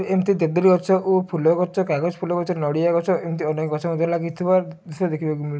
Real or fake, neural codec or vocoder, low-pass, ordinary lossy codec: real; none; none; none